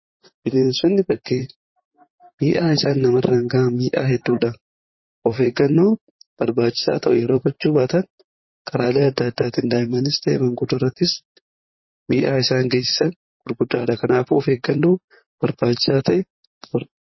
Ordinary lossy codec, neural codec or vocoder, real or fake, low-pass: MP3, 24 kbps; vocoder, 44.1 kHz, 128 mel bands, Pupu-Vocoder; fake; 7.2 kHz